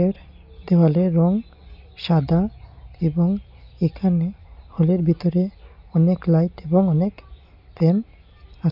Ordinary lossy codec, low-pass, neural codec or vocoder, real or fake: none; 5.4 kHz; none; real